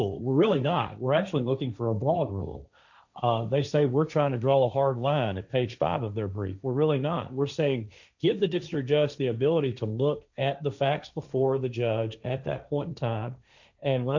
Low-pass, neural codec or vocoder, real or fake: 7.2 kHz; codec, 16 kHz, 1.1 kbps, Voila-Tokenizer; fake